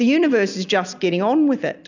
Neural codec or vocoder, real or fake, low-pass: none; real; 7.2 kHz